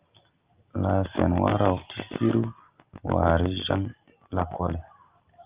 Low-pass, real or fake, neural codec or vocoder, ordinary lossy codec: 3.6 kHz; real; none; Opus, 32 kbps